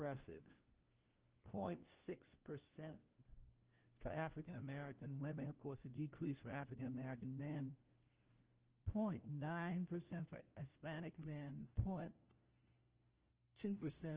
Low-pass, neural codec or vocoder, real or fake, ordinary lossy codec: 3.6 kHz; codec, 16 kHz, 1 kbps, FunCodec, trained on LibriTTS, 50 frames a second; fake; Opus, 16 kbps